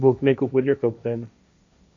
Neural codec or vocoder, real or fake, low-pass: codec, 16 kHz, 1.1 kbps, Voila-Tokenizer; fake; 7.2 kHz